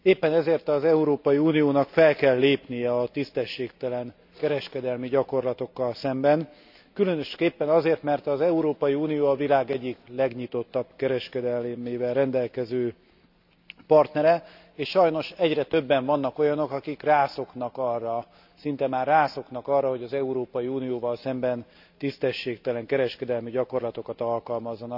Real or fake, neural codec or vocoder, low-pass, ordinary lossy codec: real; none; 5.4 kHz; none